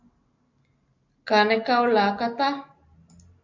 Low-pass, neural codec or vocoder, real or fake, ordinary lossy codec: 7.2 kHz; none; real; MP3, 48 kbps